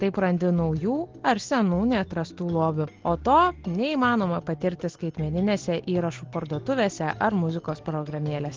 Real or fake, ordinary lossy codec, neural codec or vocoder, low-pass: real; Opus, 16 kbps; none; 7.2 kHz